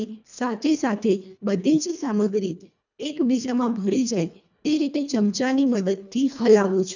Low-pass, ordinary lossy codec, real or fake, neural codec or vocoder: 7.2 kHz; none; fake; codec, 24 kHz, 1.5 kbps, HILCodec